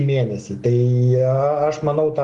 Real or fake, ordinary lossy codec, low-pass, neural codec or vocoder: real; Opus, 24 kbps; 10.8 kHz; none